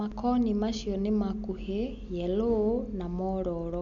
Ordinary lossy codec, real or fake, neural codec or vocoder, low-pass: none; real; none; 7.2 kHz